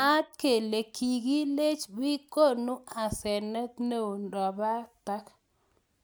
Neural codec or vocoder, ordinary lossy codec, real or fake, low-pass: none; none; real; none